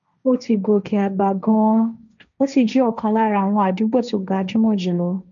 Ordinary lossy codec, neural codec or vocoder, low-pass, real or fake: none; codec, 16 kHz, 1.1 kbps, Voila-Tokenizer; 7.2 kHz; fake